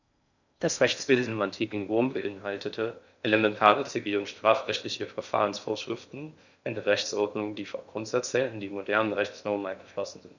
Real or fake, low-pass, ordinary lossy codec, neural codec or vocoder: fake; 7.2 kHz; none; codec, 16 kHz in and 24 kHz out, 0.6 kbps, FocalCodec, streaming, 4096 codes